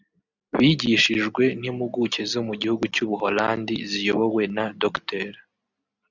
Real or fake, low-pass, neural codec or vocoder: real; 7.2 kHz; none